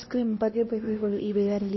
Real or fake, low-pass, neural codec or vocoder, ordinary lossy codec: fake; 7.2 kHz; codec, 16 kHz, 1 kbps, X-Codec, HuBERT features, trained on LibriSpeech; MP3, 24 kbps